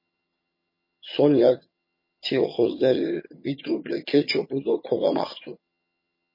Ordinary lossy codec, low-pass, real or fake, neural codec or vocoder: MP3, 24 kbps; 5.4 kHz; fake; vocoder, 22.05 kHz, 80 mel bands, HiFi-GAN